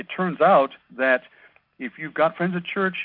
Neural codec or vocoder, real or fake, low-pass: none; real; 5.4 kHz